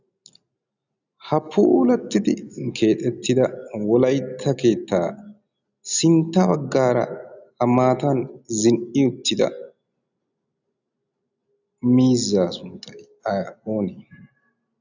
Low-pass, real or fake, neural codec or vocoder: 7.2 kHz; real; none